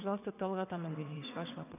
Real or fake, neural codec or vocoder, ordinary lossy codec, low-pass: fake; vocoder, 22.05 kHz, 80 mel bands, WaveNeXt; none; 3.6 kHz